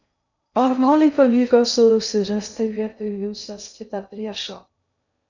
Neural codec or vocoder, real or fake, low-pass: codec, 16 kHz in and 24 kHz out, 0.6 kbps, FocalCodec, streaming, 2048 codes; fake; 7.2 kHz